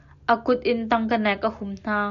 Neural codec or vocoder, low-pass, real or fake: none; 7.2 kHz; real